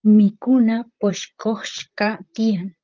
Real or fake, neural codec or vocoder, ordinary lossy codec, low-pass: fake; vocoder, 44.1 kHz, 128 mel bands, Pupu-Vocoder; Opus, 32 kbps; 7.2 kHz